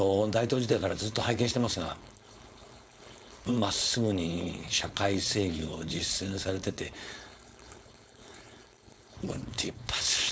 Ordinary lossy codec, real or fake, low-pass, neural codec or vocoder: none; fake; none; codec, 16 kHz, 4.8 kbps, FACodec